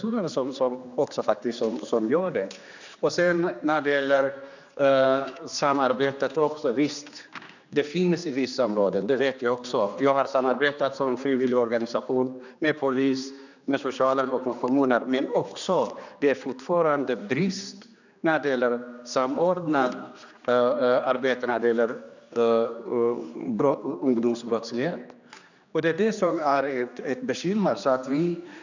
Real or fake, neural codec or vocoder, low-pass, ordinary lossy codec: fake; codec, 16 kHz, 2 kbps, X-Codec, HuBERT features, trained on general audio; 7.2 kHz; none